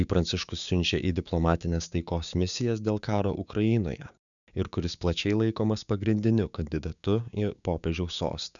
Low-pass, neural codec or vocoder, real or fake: 7.2 kHz; codec, 16 kHz, 6 kbps, DAC; fake